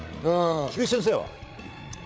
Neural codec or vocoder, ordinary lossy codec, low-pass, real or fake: codec, 16 kHz, 8 kbps, FreqCodec, larger model; none; none; fake